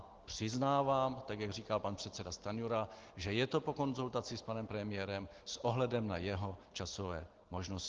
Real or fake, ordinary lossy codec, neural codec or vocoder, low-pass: real; Opus, 16 kbps; none; 7.2 kHz